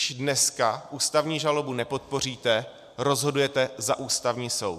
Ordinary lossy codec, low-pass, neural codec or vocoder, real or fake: MP3, 96 kbps; 14.4 kHz; none; real